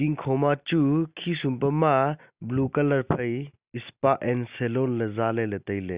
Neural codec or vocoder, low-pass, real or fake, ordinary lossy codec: none; 3.6 kHz; real; Opus, 64 kbps